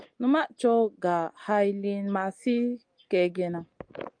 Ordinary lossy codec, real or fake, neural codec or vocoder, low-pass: Opus, 32 kbps; real; none; 9.9 kHz